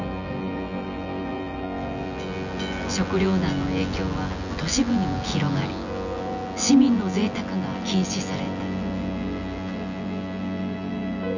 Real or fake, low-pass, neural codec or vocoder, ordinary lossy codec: fake; 7.2 kHz; vocoder, 24 kHz, 100 mel bands, Vocos; none